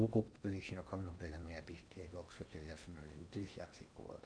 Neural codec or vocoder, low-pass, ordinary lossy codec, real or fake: codec, 16 kHz in and 24 kHz out, 0.8 kbps, FocalCodec, streaming, 65536 codes; 9.9 kHz; MP3, 64 kbps; fake